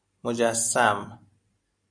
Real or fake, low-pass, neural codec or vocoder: real; 9.9 kHz; none